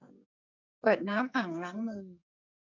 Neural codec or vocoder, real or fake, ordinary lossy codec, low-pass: codec, 44.1 kHz, 2.6 kbps, SNAC; fake; none; 7.2 kHz